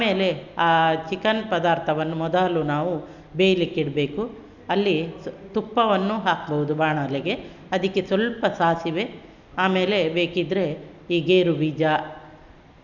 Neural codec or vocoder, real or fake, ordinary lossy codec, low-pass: none; real; none; 7.2 kHz